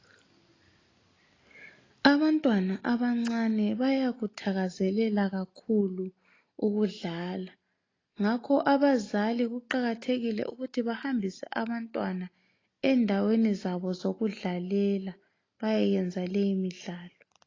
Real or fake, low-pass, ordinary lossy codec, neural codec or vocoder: real; 7.2 kHz; AAC, 32 kbps; none